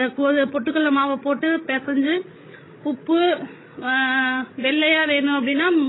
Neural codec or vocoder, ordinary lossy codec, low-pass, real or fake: codec, 16 kHz, 16 kbps, FreqCodec, larger model; AAC, 16 kbps; 7.2 kHz; fake